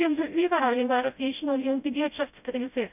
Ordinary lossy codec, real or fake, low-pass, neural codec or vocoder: none; fake; 3.6 kHz; codec, 16 kHz, 0.5 kbps, FreqCodec, smaller model